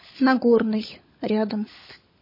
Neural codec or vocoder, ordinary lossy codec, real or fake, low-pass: codec, 16 kHz, 16 kbps, FunCodec, trained on LibriTTS, 50 frames a second; MP3, 24 kbps; fake; 5.4 kHz